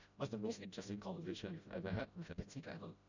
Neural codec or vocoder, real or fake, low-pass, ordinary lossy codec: codec, 16 kHz, 0.5 kbps, FreqCodec, smaller model; fake; 7.2 kHz; none